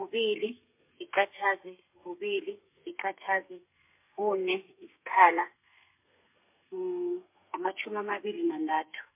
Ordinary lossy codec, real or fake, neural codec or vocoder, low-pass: MP3, 32 kbps; fake; codec, 32 kHz, 1.9 kbps, SNAC; 3.6 kHz